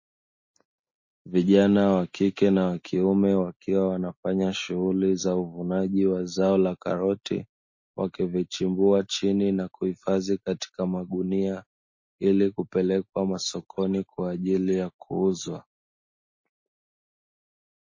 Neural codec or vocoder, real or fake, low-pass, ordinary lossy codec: none; real; 7.2 kHz; MP3, 32 kbps